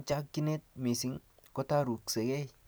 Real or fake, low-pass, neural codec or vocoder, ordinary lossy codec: real; none; none; none